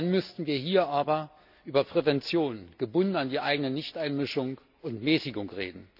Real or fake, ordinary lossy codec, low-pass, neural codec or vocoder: real; none; 5.4 kHz; none